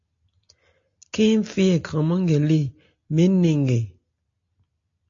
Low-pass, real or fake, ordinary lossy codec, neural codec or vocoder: 7.2 kHz; real; AAC, 48 kbps; none